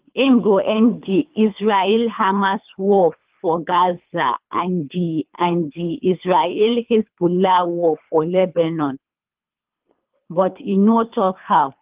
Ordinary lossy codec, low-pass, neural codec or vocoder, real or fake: Opus, 32 kbps; 3.6 kHz; codec, 24 kHz, 3 kbps, HILCodec; fake